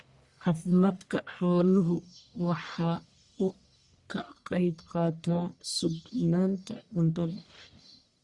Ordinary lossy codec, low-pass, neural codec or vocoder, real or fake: Opus, 64 kbps; 10.8 kHz; codec, 44.1 kHz, 1.7 kbps, Pupu-Codec; fake